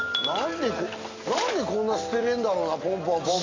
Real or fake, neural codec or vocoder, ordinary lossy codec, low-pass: real; none; AAC, 32 kbps; 7.2 kHz